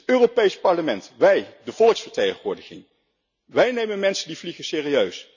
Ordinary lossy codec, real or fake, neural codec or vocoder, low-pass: none; real; none; 7.2 kHz